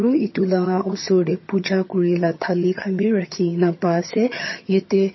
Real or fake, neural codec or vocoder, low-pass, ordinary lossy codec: fake; vocoder, 22.05 kHz, 80 mel bands, HiFi-GAN; 7.2 kHz; MP3, 24 kbps